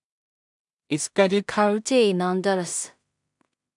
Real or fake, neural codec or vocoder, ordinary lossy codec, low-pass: fake; codec, 16 kHz in and 24 kHz out, 0.4 kbps, LongCat-Audio-Codec, two codebook decoder; MP3, 96 kbps; 10.8 kHz